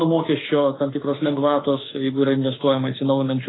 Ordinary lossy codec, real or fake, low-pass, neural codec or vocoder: AAC, 16 kbps; fake; 7.2 kHz; autoencoder, 48 kHz, 32 numbers a frame, DAC-VAE, trained on Japanese speech